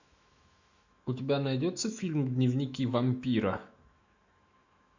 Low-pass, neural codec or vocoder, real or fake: 7.2 kHz; autoencoder, 48 kHz, 128 numbers a frame, DAC-VAE, trained on Japanese speech; fake